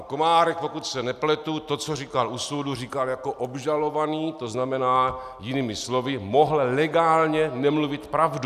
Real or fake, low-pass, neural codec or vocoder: real; 14.4 kHz; none